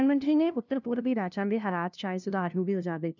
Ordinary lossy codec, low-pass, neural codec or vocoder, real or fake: none; 7.2 kHz; codec, 16 kHz, 0.5 kbps, FunCodec, trained on LibriTTS, 25 frames a second; fake